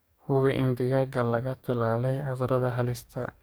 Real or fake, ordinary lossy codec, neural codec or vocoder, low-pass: fake; none; codec, 44.1 kHz, 2.6 kbps, DAC; none